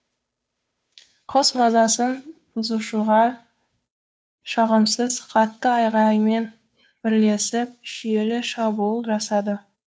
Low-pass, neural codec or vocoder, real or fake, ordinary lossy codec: none; codec, 16 kHz, 2 kbps, FunCodec, trained on Chinese and English, 25 frames a second; fake; none